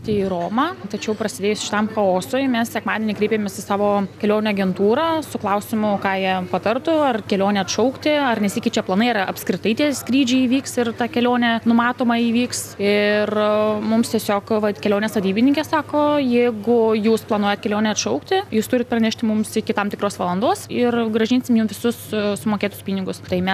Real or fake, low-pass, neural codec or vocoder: real; 14.4 kHz; none